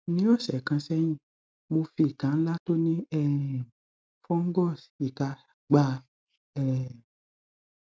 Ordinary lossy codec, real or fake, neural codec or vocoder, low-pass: none; real; none; none